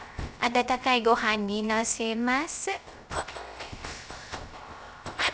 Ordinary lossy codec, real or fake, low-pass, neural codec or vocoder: none; fake; none; codec, 16 kHz, 0.3 kbps, FocalCodec